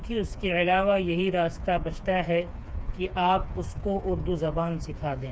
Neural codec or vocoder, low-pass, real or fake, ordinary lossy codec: codec, 16 kHz, 4 kbps, FreqCodec, smaller model; none; fake; none